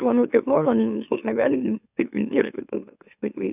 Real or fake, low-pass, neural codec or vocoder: fake; 3.6 kHz; autoencoder, 44.1 kHz, a latent of 192 numbers a frame, MeloTTS